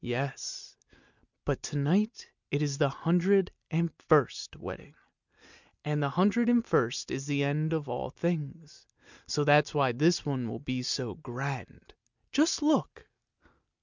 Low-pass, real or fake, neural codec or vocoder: 7.2 kHz; real; none